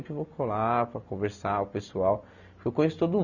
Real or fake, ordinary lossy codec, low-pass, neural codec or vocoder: real; none; 7.2 kHz; none